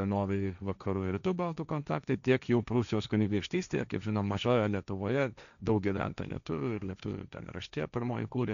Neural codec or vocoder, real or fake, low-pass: codec, 16 kHz, 1.1 kbps, Voila-Tokenizer; fake; 7.2 kHz